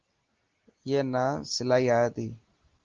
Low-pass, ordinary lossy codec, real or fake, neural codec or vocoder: 7.2 kHz; Opus, 16 kbps; real; none